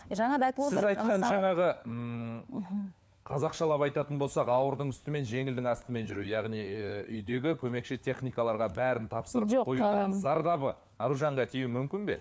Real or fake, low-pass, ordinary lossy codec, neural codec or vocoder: fake; none; none; codec, 16 kHz, 4 kbps, FunCodec, trained on LibriTTS, 50 frames a second